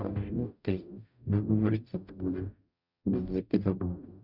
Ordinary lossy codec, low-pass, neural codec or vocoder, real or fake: none; 5.4 kHz; codec, 44.1 kHz, 0.9 kbps, DAC; fake